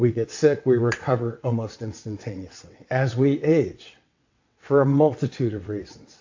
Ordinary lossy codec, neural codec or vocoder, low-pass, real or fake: AAC, 48 kbps; vocoder, 22.05 kHz, 80 mel bands, WaveNeXt; 7.2 kHz; fake